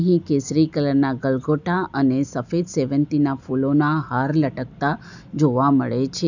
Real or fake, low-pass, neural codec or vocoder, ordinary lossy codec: real; 7.2 kHz; none; none